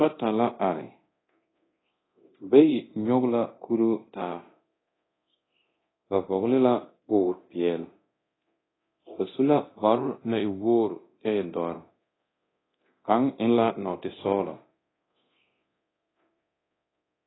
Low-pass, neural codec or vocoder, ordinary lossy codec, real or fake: 7.2 kHz; codec, 24 kHz, 0.9 kbps, DualCodec; AAC, 16 kbps; fake